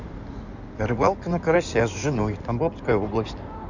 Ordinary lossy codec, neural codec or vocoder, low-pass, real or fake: none; codec, 16 kHz in and 24 kHz out, 2.2 kbps, FireRedTTS-2 codec; 7.2 kHz; fake